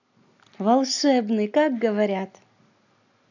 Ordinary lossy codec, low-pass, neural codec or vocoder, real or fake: none; 7.2 kHz; none; real